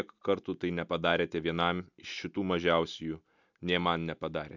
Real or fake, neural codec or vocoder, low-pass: real; none; 7.2 kHz